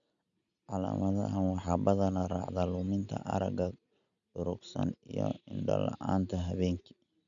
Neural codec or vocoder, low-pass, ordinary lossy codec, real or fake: none; 7.2 kHz; none; real